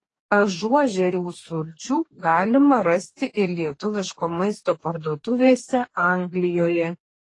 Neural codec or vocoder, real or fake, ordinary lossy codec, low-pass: codec, 44.1 kHz, 2.6 kbps, DAC; fake; AAC, 32 kbps; 10.8 kHz